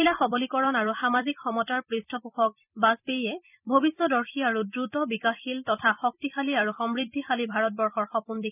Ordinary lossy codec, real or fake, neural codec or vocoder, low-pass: none; fake; vocoder, 44.1 kHz, 128 mel bands every 256 samples, BigVGAN v2; 3.6 kHz